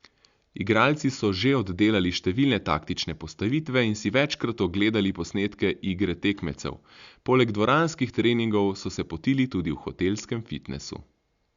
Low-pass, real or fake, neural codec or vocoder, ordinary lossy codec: 7.2 kHz; real; none; Opus, 64 kbps